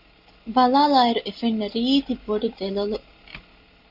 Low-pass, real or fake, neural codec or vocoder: 5.4 kHz; real; none